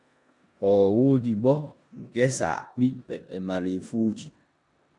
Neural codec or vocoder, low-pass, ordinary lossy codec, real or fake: codec, 16 kHz in and 24 kHz out, 0.9 kbps, LongCat-Audio-Codec, four codebook decoder; 10.8 kHz; MP3, 96 kbps; fake